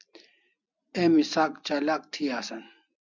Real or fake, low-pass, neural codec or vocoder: real; 7.2 kHz; none